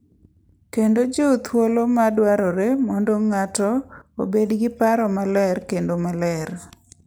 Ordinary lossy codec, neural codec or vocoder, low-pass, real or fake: none; none; none; real